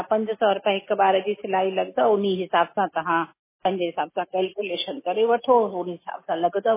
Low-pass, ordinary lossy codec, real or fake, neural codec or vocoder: 3.6 kHz; MP3, 16 kbps; real; none